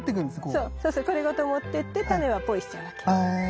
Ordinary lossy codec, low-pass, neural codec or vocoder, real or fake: none; none; none; real